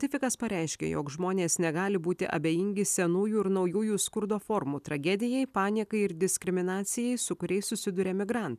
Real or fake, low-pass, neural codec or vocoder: real; 14.4 kHz; none